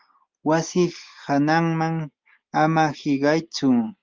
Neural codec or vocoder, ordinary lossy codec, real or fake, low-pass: none; Opus, 24 kbps; real; 7.2 kHz